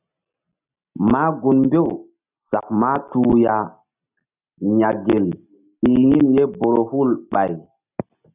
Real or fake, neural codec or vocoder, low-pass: real; none; 3.6 kHz